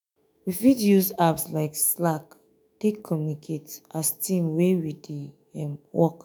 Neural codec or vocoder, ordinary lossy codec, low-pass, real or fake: autoencoder, 48 kHz, 128 numbers a frame, DAC-VAE, trained on Japanese speech; none; none; fake